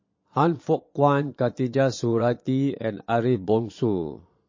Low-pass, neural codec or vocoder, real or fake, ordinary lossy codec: 7.2 kHz; vocoder, 22.05 kHz, 80 mel bands, Vocos; fake; MP3, 32 kbps